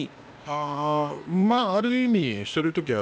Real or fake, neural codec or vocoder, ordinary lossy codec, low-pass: fake; codec, 16 kHz, 0.8 kbps, ZipCodec; none; none